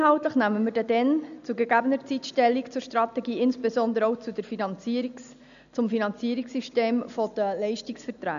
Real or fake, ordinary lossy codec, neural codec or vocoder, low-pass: real; none; none; 7.2 kHz